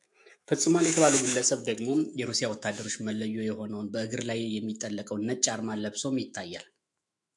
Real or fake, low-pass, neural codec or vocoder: fake; 10.8 kHz; autoencoder, 48 kHz, 128 numbers a frame, DAC-VAE, trained on Japanese speech